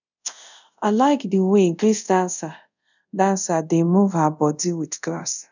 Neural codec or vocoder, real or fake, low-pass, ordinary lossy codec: codec, 24 kHz, 0.5 kbps, DualCodec; fake; 7.2 kHz; none